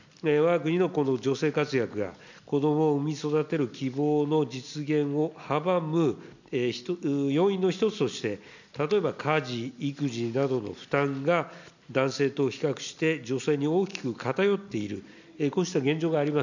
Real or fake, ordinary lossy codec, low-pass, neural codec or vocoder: real; none; 7.2 kHz; none